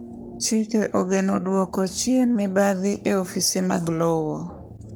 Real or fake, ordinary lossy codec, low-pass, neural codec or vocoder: fake; none; none; codec, 44.1 kHz, 3.4 kbps, Pupu-Codec